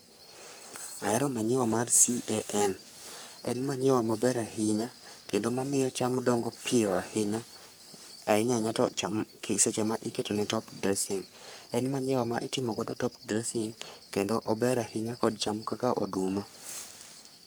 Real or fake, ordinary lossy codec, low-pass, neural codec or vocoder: fake; none; none; codec, 44.1 kHz, 3.4 kbps, Pupu-Codec